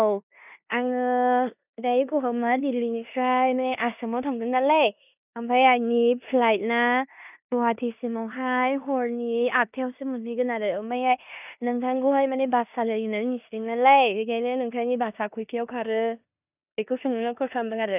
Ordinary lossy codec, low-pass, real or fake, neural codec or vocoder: none; 3.6 kHz; fake; codec, 16 kHz in and 24 kHz out, 0.9 kbps, LongCat-Audio-Codec, four codebook decoder